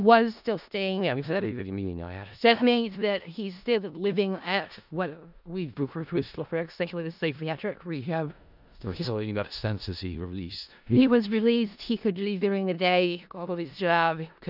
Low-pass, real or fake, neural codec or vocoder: 5.4 kHz; fake; codec, 16 kHz in and 24 kHz out, 0.4 kbps, LongCat-Audio-Codec, four codebook decoder